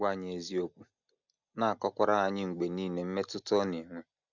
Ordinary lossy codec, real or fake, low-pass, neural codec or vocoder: none; real; 7.2 kHz; none